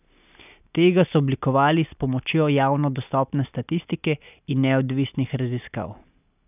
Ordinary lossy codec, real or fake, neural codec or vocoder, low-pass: none; real; none; 3.6 kHz